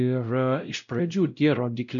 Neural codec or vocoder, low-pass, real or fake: codec, 16 kHz, 0.5 kbps, X-Codec, WavLM features, trained on Multilingual LibriSpeech; 7.2 kHz; fake